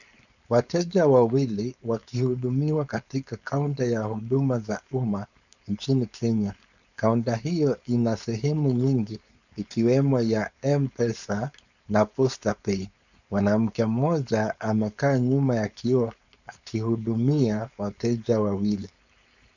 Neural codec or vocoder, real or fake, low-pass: codec, 16 kHz, 4.8 kbps, FACodec; fake; 7.2 kHz